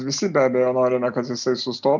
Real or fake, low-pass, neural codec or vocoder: real; 7.2 kHz; none